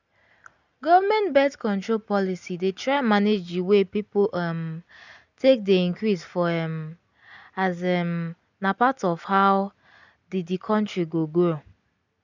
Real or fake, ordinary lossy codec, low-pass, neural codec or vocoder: real; none; 7.2 kHz; none